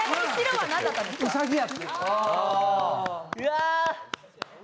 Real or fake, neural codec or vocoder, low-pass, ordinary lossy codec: real; none; none; none